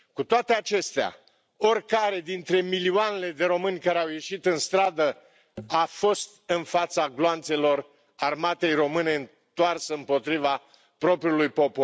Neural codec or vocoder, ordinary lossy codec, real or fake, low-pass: none; none; real; none